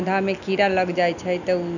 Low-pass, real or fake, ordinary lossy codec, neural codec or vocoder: 7.2 kHz; real; none; none